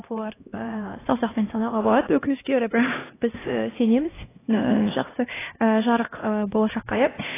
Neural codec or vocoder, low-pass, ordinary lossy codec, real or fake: codec, 16 kHz, 1 kbps, X-Codec, HuBERT features, trained on LibriSpeech; 3.6 kHz; AAC, 16 kbps; fake